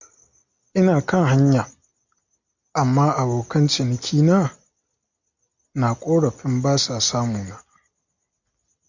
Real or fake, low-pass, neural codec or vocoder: real; 7.2 kHz; none